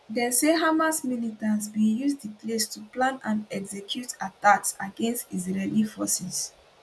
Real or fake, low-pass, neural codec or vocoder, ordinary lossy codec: real; none; none; none